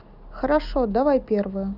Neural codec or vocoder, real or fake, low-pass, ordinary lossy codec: none; real; 5.4 kHz; none